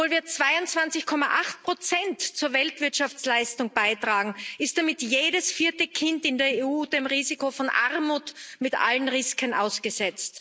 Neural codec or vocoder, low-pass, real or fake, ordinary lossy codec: none; none; real; none